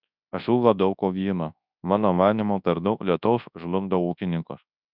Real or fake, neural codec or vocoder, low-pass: fake; codec, 24 kHz, 0.9 kbps, WavTokenizer, large speech release; 5.4 kHz